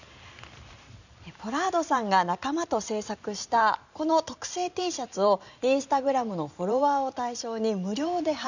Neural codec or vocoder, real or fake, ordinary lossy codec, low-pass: vocoder, 44.1 kHz, 128 mel bands every 512 samples, BigVGAN v2; fake; none; 7.2 kHz